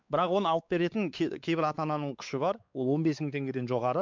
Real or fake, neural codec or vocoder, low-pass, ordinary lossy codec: fake; codec, 16 kHz, 4 kbps, X-Codec, WavLM features, trained on Multilingual LibriSpeech; 7.2 kHz; MP3, 64 kbps